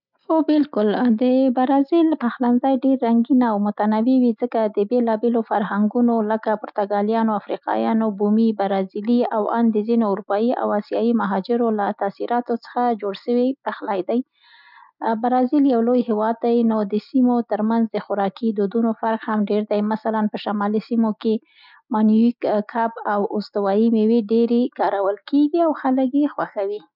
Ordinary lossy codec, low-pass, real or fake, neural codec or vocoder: none; 5.4 kHz; real; none